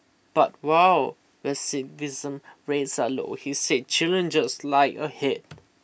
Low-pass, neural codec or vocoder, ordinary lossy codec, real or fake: none; none; none; real